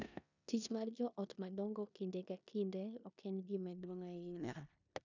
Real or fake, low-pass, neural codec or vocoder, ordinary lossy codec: fake; 7.2 kHz; codec, 16 kHz in and 24 kHz out, 0.9 kbps, LongCat-Audio-Codec, four codebook decoder; none